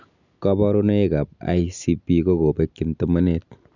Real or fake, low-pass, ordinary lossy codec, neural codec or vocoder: real; 7.2 kHz; none; none